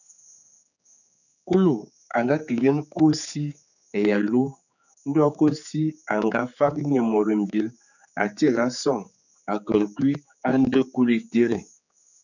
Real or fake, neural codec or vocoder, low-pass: fake; codec, 16 kHz, 4 kbps, X-Codec, HuBERT features, trained on general audio; 7.2 kHz